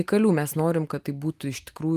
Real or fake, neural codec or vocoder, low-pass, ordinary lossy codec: real; none; 14.4 kHz; Opus, 32 kbps